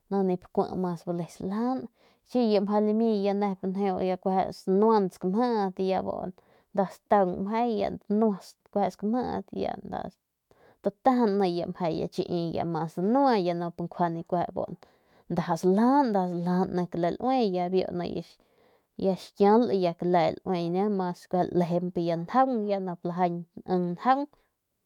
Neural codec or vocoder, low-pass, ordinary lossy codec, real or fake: autoencoder, 48 kHz, 128 numbers a frame, DAC-VAE, trained on Japanese speech; 19.8 kHz; MP3, 96 kbps; fake